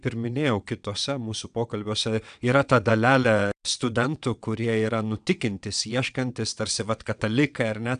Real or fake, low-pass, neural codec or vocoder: fake; 9.9 kHz; vocoder, 22.05 kHz, 80 mel bands, WaveNeXt